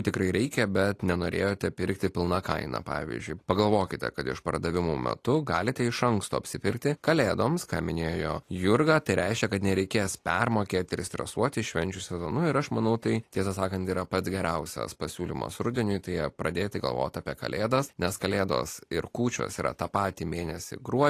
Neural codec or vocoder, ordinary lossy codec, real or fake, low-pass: none; AAC, 48 kbps; real; 14.4 kHz